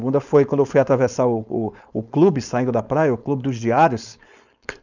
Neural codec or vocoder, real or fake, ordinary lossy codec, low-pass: codec, 16 kHz, 4.8 kbps, FACodec; fake; none; 7.2 kHz